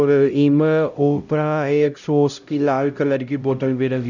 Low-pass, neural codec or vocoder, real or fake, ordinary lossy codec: 7.2 kHz; codec, 16 kHz, 0.5 kbps, X-Codec, HuBERT features, trained on LibriSpeech; fake; none